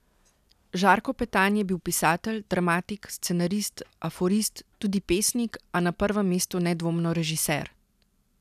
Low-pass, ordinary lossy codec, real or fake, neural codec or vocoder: 14.4 kHz; none; real; none